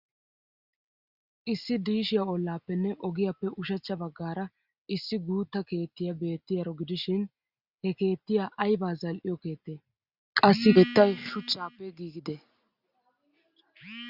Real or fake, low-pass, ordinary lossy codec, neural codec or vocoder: real; 5.4 kHz; Opus, 64 kbps; none